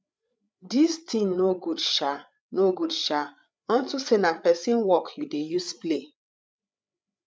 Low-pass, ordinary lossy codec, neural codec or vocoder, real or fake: none; none; codec, 16 kHz, 8 kbps, FreqCodec, larger model; fake